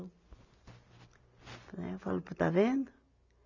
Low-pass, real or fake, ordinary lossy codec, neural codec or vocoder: 7.2 kHz; real; none; none